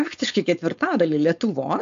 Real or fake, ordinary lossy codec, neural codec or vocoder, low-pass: fake; AAC, 64 kbps; codec, 16 kHz, 4.8 kbps, FACodec; 7.2 kHz